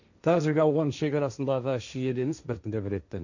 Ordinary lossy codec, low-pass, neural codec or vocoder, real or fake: none; 7.2 kHz; codec, 16 kHz, 1.1 kbps, Voila-Tokenizer; fake